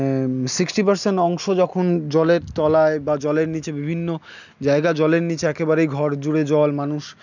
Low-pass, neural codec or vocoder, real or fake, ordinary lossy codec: 7.2 kHz; none; real; none